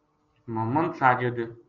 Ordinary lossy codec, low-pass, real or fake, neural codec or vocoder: Opus, 32 kbps; 7.2 kHz; real; none